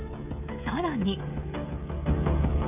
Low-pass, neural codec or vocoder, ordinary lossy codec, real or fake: 3.6 kHz; codec, 16 kHz, 16 kbps, FreqCodec, smaller model; none; fake